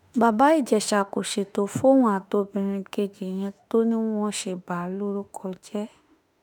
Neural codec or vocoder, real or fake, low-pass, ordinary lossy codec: autoencoder, 48 kHz, 32 numbers a frame, DAC-VAE, trained on Japanese speech; fake; none; none